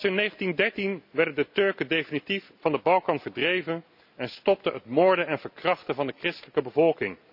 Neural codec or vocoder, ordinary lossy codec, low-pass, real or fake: none; none; 5.4 kHz; real